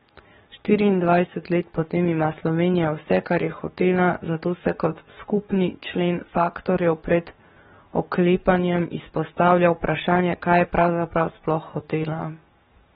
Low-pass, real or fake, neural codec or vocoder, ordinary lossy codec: 19.8 kHz; fake; codec, 44.1 kHz, 7.8 kbps, DAC; AAC, 16 kbps